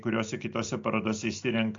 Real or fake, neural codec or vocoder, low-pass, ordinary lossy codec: real; none; 7.2 kHz; AAC, 48 kbps